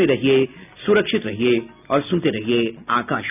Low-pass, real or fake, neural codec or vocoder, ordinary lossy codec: 3.6 kHz; real; none; none